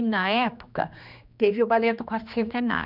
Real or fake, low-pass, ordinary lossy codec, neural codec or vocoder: fake; 5.4 kHz; none; codec, 16 kHz, 2 kbps, X-Codec, HuBERT features, trained on general audio